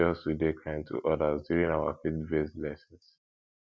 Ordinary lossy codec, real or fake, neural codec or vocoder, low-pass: none; real; none; none